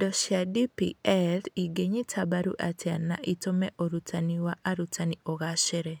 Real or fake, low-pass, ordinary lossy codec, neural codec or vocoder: real; none; none; none